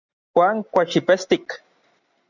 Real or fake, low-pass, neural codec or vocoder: real; 7.2 kHz; none